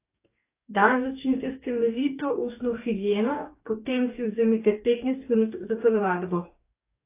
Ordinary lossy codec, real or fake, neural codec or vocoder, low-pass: AAC, 24 kbps; fake; codec, 44.1 kHz, 2.6 kbps, DAC; 3.6 kHz